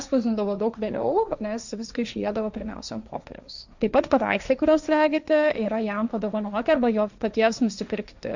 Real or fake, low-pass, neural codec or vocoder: fake; 7.2 kHz; codec, 16 kHz, 1.1 kbps, Voila-Tokenizer